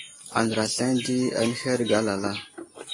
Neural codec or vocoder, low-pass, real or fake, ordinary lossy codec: none; 10.8 kHz; real; AAC, 32 kbps